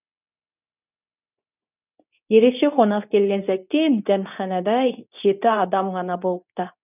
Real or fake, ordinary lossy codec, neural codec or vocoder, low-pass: fake; none; codec, 24 kHz, 0.9 kbps, WavTokenizer, medium speech release version 2; 3.6 kHz